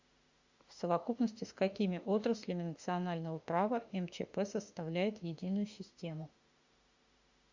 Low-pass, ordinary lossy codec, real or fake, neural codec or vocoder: 7.2 kHz; Opus, 64 kbps; fake; autoencoder, 48 kHz, 32 numbers a frame, DAC-VAE, trained on Japanese speech